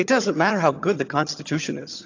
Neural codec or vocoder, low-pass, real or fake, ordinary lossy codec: vocoder, 22.05 kHz, 80 mel bands, HiFi-GAN; 7.2 kHz; fake; AAC, 48 kbps